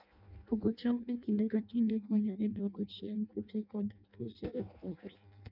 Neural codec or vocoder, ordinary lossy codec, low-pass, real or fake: codec, 16 kHz in and 24 kHz out, 0.6 kbps, FireRedTTS-2 codec; none; 5.4 kHz; fake